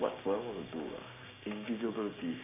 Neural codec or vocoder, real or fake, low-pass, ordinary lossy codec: codec, 16 kHz, 6 kbps, DAC; fake; 3.6 kHz; none